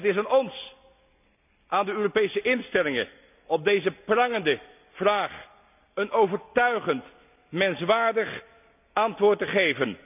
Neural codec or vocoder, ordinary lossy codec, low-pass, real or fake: none; none; 3.6 kHz; real